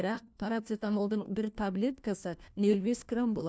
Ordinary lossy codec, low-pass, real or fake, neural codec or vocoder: none; none; fake; codec, 16 kHz, 1 kbps, FunCodec, trained on LibriTTS, 50 frames a second